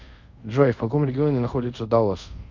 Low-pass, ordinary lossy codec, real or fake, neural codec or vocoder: 7.2 kHz; MP3, 48 kbps; fake; codec, 24 kHz, 0.5 kbps, DualCodec